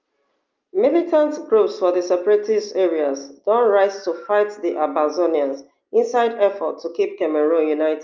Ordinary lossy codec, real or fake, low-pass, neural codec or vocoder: Opus, 24 kbps; real; 7.2 kHz; none